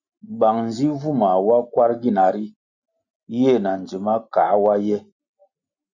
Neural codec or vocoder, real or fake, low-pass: none; real; 7.2 kHz